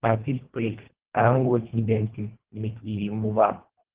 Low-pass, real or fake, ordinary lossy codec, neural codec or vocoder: 3.6 kHz; fake; Opus, 16 kbps; codec, 24 kHz, 1.5 kbps, HILCodec